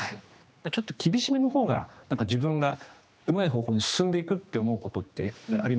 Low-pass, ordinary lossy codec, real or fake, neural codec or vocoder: none; none; fake; codec, 16 kHz, 2 kbps, X-Codec, HuBERT features, trained on general audio